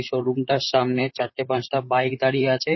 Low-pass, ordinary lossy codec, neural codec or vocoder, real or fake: 7.2 kHz; MP3, 24 kbps; vocoder, 44.1 kHz, 128 mel bands, Pupu-Vocoder; fake